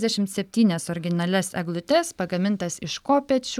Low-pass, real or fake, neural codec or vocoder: 19.8 kHz; real; none